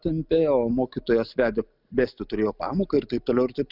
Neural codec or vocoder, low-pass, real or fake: none; 5.4 kHz; real